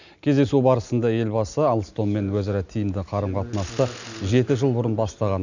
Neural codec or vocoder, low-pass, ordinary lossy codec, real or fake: none; 7.2 kHz; none; real